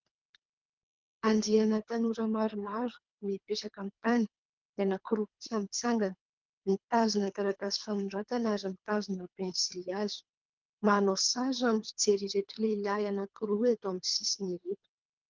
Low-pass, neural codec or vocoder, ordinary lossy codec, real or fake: 7.2 kHz; codec, 24 kHz, 3 kbps, HILCodec; Opus, 32 kbps; fake